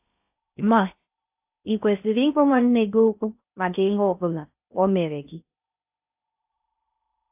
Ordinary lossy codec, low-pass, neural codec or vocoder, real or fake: AAC, 32 kbps; 3.6 kHz; codec, 16 kHz in and 24 kHz out, 0.6 kbps, FocalCodec, streaming, 4096 codes; fake